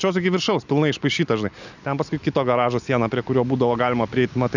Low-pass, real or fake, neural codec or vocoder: 7.2 kHz; real; none